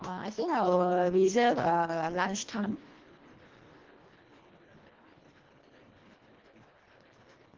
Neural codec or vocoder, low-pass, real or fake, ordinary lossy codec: codec, 24 kHz, 1.5 kbps, HILCodec; 7.2 kHz; fake; Opus, 16 kbps